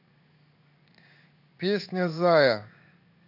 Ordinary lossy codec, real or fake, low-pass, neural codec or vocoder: none; fake; 5.4 kHz; codec, 16 kHz in and 24 kHz out, 1 kbps, XY-Tokenizer